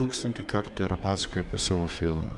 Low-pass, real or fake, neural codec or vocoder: 10.8 kHz; fake; codec, 24 kHz, 1 kbps, SNAC